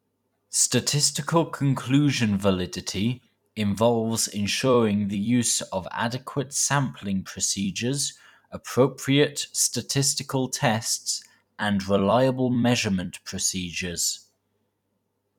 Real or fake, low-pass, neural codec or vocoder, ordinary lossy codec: fake; 19.8 kHz; vocoder, 44.1 kHz, 128 mel bands every 256 samples, BigVGAN v2; none